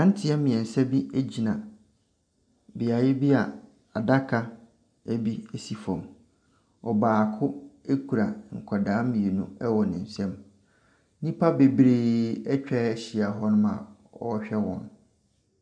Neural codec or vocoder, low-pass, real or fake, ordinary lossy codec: none; 9.9 kHz; real; MP3, 96 kbps